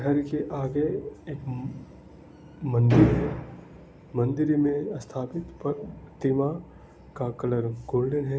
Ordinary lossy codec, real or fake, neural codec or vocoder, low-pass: none; real; none; none